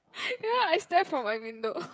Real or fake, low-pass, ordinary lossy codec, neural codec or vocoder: fake; none; none; codec, 16 kHz, 8 kbps, FreqCodec, smaller model